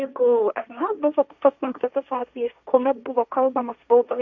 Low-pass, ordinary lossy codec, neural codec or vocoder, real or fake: 7.2 kHz; MP3, 64 kbps; codec, 16 kHz, 1.1 kbps, Voila-Tokenizer; fake